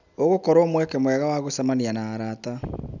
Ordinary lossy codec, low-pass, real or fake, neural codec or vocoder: none; 7.2 kHz; real; none